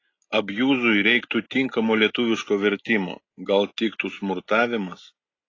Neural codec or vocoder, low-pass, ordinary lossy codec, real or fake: none; 7.2 kHz; AAC, 32 kbps; real